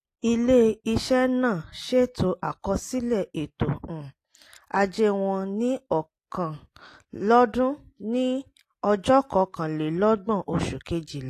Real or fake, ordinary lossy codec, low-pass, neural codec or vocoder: real; AAC, 48 kbps; 14.4 kHz; none